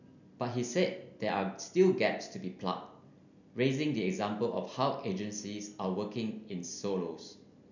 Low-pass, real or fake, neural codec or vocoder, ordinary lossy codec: 7.2 kHz; real; none; none